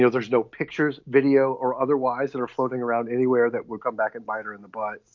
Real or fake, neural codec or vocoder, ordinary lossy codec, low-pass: real; none; MP3, 48 kbps; 7.2 kHz